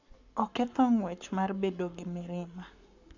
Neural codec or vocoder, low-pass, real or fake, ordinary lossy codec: codec, 44.1 kHz, 7.8 kbps, Pupu-Codec; 7.2 kHz; fake; none